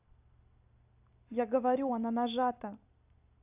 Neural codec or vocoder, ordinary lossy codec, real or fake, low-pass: vocoder, 44.1 kHz, 128 mel bands every 256 samples, BigVGAN v2; AAC, 32 kbps; fake; 3.6 kHz